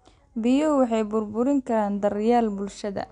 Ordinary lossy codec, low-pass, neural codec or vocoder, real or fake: none; 9.9 kHz; none; real